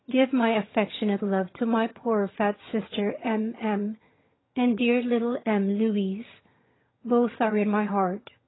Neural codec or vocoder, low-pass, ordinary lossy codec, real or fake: vocoder, 22.05 kHz, 80 mel bands, HiFi-GAN; 7.2 kHz; AAC, 16 kbps; fake